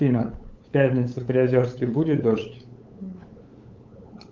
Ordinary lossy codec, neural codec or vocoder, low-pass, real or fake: Opus, 32 kbps; codec, 16 kHz, 8 kbps, FunCodec, trained on LibriTTS, 25 frames a second; 7.2 kHz; fake